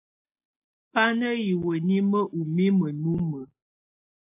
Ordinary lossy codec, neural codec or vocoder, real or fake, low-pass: AAC, 32 kbps; none; real; 3.6 kHz